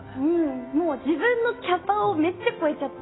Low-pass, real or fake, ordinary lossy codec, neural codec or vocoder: 7.2 kHz; real; AAC, 16 kbps; none